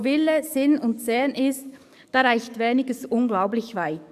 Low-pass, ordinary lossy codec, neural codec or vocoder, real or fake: 14.4 kHz; none; codec, 44.1 kHz, 7.8 kbps, DAC; fake